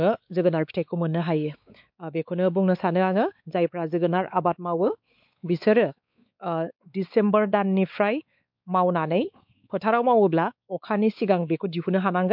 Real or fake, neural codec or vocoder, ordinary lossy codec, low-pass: fake; codec, 16 kHz, 4 kbps, X-Codec, WavLM features, trained on Multilingual LibriSpeech; MP3, 48 kbps; 5.4 kHz